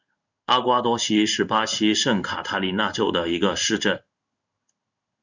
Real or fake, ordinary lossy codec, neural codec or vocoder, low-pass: fake; Opus, 64 kbps; codec, 16 kHz in and 24 kHz out, 1 kbps, XY-Tokenizer; 7.2 kHz